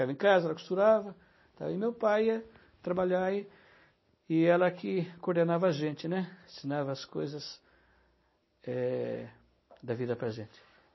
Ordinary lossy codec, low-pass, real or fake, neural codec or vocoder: MP3, 24 kbps; 7.2 kHz; real; none